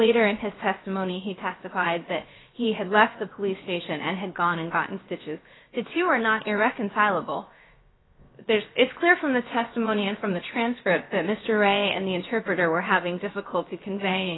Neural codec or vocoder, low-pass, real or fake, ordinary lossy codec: codec, 16 kHz, about 1 kbps, DyCAST, with the encoder's durations; 7.2 kHz; fake; AAC, 16 kbps